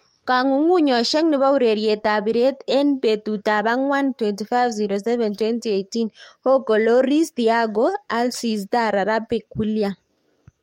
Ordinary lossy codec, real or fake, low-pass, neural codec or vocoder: MP3, 64 kbps; fake; 19.8 kHz; codec, 44.1 kHz, 7.8 kbps, DAC